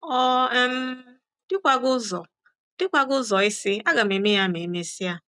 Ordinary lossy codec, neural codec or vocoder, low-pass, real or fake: none; none; 10.8 kHz; real